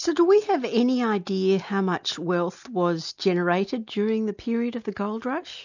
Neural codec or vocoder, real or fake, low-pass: none; real; 7.2 kHz